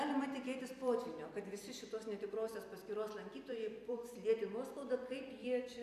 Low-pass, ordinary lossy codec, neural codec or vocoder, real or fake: 14.4 kHz; AAC, 96 kbps; vocoder, 44.1 kHz, 128 mel bands every 256 samples, BigVGAN v2; fake